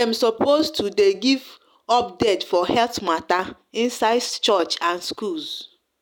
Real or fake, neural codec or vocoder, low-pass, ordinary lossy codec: real; none; 19.8 kHz; none